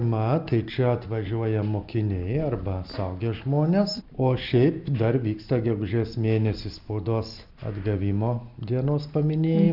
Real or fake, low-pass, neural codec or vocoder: real; 5.4 kHz; none